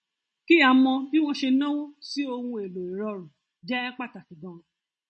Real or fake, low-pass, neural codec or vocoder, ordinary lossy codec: real; 10.8 kHz; none; MP3, 32 kbps